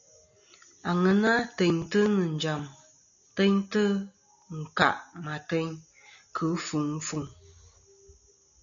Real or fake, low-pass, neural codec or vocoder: real; 7.2 kHz; none